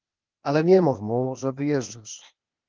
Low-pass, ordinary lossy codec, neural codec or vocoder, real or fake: 7.2 kHz; Opus, 32 kbps; codec, 16 kHz, 0.8 kbps, ZipCodec; fake